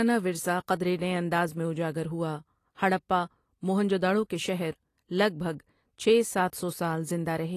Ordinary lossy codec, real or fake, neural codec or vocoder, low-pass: AAC, 48 kbps; real; none; 14.4 kHz